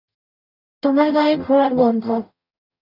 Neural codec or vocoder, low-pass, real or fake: codec, 44.1 kHz, 0.9 kbps, DAC; 5.4 kHz; fake